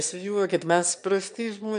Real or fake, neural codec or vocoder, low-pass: fake; autoencoder, 22.05 kHz, a latent of 192 numbers a frame, VITS, trained on one speaker; 9.9 kHz